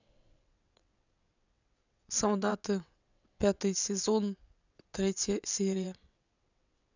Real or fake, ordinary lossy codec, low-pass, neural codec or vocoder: fake; none; 7.2 kHz; vocoder, 22.05 kHz, 80 mel bands, WaveNeXt